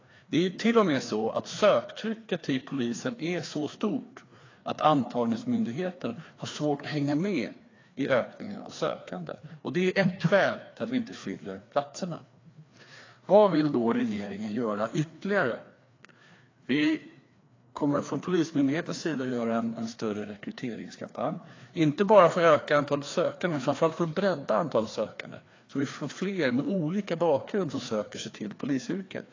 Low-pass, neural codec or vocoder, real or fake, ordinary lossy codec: 7.2 kHz; codec, 16 kHz, 2 kbps, FreqCodec, larger model; fake; AAC, 32 kbps